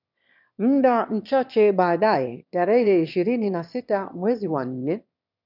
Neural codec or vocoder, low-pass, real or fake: autoencoder, 22.05 kHz, a latent of 192 numbers a frame, VITS, trained on one speaker; 5.4 kHz; fake